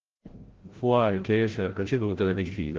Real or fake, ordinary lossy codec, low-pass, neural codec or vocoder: fake; Opus, 16 kbps; 7.2 kHz; codec, 16 kHz, 0.5 kbps, FreqCodec, larger model